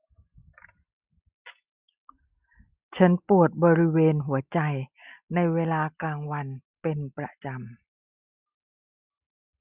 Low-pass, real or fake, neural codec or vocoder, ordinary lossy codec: 3.6 kHz; real; none; Opus, 64 kbps